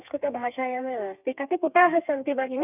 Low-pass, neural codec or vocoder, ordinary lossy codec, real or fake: 3.6 kHz; codec, 32 kHz, 1.9 kbps, SNAC; none; fake